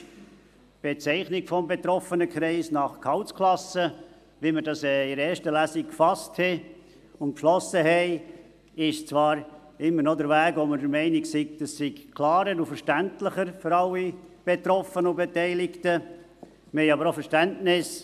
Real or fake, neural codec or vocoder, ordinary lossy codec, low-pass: real; none; AAC, 96 kbps; 14.4 kHz